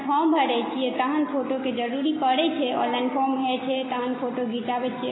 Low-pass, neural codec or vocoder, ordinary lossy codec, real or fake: 7.2 kHz; none; AAC, 16 kbps; real